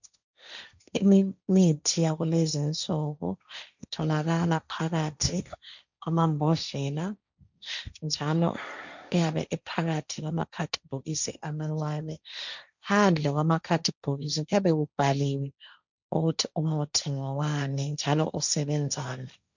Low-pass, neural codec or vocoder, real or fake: 7.2 kHz; codec, 16 kHz, 1.1 kbps, Voila-Tokenizer; fake